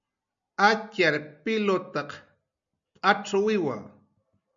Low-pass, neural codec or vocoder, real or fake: 7.2 kHz; none; real